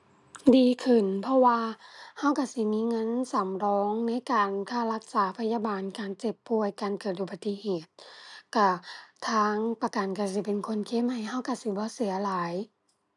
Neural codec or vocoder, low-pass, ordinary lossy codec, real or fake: none; 10.8 kHz; none; real